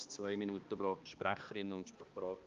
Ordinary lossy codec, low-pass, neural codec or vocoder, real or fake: Opus, 32 kbps; 7.2 kHz; codec, 16 kHz, 2 kbps, X-Codec, HuBERT features, trained on balanced general audio; fake